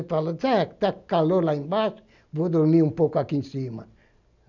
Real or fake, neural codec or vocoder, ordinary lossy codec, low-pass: real; none; none; 7.2 kHz